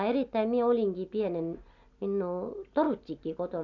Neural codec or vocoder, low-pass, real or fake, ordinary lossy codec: none; 7.2 kHz; real; none